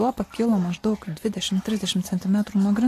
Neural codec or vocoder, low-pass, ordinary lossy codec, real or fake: none; 14.4 kHz; AAC, 48 kbps; real